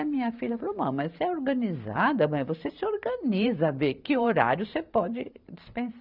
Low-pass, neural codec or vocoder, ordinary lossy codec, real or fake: 5.4 kHz; vocoder, 44.1 kHz, 128 mel bands every 512 samples, BigVGAN v2; none; fake